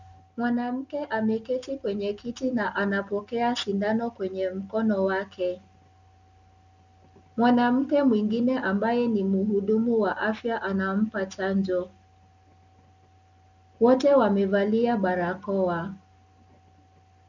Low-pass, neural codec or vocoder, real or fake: 7.2 kHz; none; real